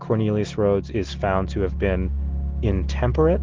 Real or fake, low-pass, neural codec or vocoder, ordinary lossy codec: real; 7.2 kHz; none; Opus, 16 kbps